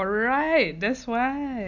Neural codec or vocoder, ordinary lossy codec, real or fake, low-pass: none; none; real; 7.2 kHz